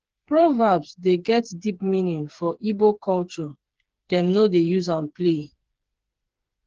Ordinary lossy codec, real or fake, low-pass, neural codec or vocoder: Opus, 16 kbps; fake; 7.2 kHz; codec, 16 kHz, 4 kbps, FreqCodec, smaller model